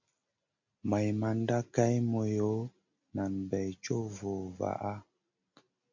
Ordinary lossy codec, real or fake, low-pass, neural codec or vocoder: MP3, 48 kbps; real; 7.2 kHz; none